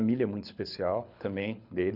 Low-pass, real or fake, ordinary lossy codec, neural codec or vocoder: 5.4 kHz; fake; none; codec, 24 kHz, 6 kbps, HILCodec